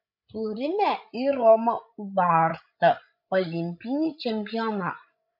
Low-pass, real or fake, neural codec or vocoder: 5.4 kHz; fake; codec, 16 kHz, 16 kbps, FreqCodec, larger model